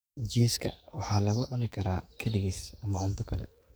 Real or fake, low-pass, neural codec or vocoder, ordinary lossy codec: fake; none; codec, 44.1 kHz, 2.6 kbps, SNAC; none